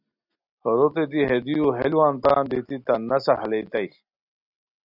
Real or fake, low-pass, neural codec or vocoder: real; 5.4 kHz; none